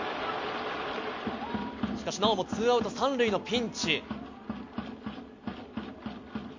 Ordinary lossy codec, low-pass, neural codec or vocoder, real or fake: MP3, 48 kbps; 7.2 kHz; none; real